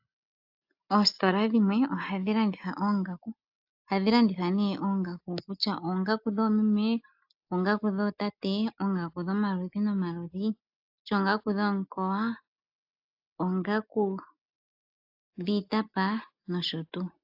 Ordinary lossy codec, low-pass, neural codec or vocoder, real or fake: AAC, 48 kbps; 5.4 kHz; none; real